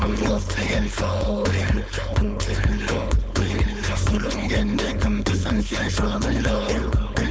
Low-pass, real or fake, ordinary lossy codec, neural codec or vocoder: none; fake; none; codec, 16 kHz, 4.8 kbps, FACodec